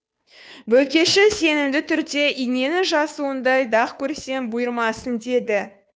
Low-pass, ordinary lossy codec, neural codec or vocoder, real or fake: none; none; codec, 16 kHz, 2 kbps, FunCodec, trained on Chinese and English, 25 frames a second; fake